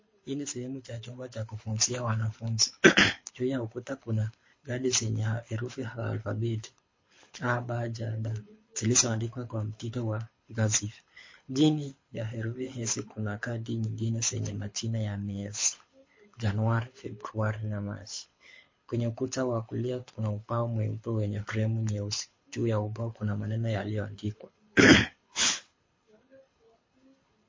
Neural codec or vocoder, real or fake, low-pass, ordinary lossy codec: codec, 24 kHz, 6 kbps, HILCodec; fake; 7.2 kHz; MP3, 32 kbps